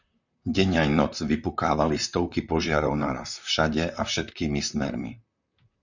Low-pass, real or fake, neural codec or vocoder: 7.2 kHz; fake; vocoder, 44.1 kHz, 128 mel bands, Pupu-Vocoder